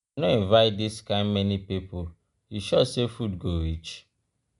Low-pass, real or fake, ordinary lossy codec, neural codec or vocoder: 10.8 kHz; real; none; none